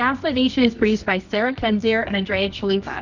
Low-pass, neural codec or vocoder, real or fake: 7.2 kHz; codec, 24 kHz, 0.9 kbps, WavTokenizer, medium music audio release; fake